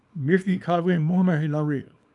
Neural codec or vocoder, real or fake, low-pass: codec, 24 kHz, 0.9 kbps, WavTokenizer, small release; fake; 10.8 kHz